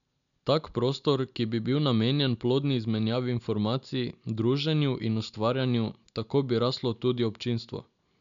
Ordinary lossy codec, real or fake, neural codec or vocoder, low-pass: none; real; none; 7.2 kHz